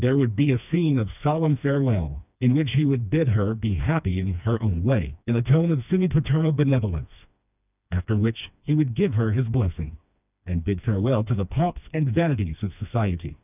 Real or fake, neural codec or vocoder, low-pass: fake; codec, 16 kHz, 2 kbps, FreqCodec, smaller model; 3.6 kHz